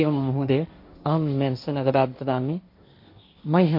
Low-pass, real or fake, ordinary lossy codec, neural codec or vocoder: 5.4 kHz; fake; MP3, 32 kbps; codec, 16 kHz, 1.1 kbps, Voila-Tokenizer